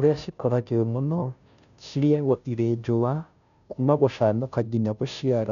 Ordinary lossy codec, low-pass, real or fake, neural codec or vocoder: none; 7.2 kHz; fake; codec, 16 kHz, 0.5 kbps, FunCodec, trained on Chinese and English, 25 frames a second